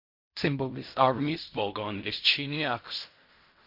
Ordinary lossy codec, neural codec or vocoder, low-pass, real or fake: MP3, 32 kbps; codec, 16 kHz in and 24 kHz out, 0.4 kbps, LongCat-Audio-Codec, fine tuned four codebook decoder; 5.4 kHz; fake